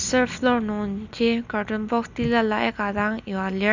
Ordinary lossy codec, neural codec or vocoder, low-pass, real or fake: none; none; 7.2 kHz; real